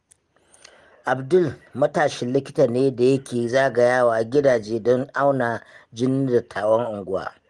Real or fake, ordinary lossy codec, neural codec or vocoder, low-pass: real; Opus, 24 kbps; none; 10.8 kHz